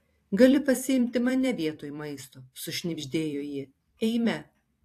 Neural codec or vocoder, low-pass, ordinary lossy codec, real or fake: vocoder, 44.1 kHz, 128 mel bands every 256 samples, BigVGAN v2; 14.4 kHz; AAC, 64 kbps; fake